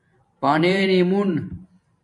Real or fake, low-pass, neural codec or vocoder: fake; 10.8 kHz; vocoder, 44.1 kHz, 128 mel bands every 512 samples, BigVGAN v2